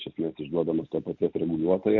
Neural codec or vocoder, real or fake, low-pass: none; real; 7.2 kHz